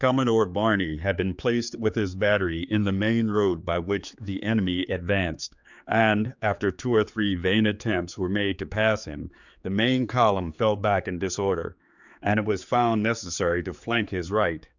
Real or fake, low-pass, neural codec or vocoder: fake; 7.2 kHz; codec, 16 kHz, 4 kbps, X-Codec, HuBERT features, trained on general audio